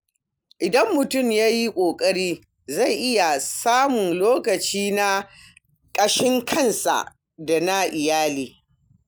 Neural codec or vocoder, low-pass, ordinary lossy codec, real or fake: none; none; none; real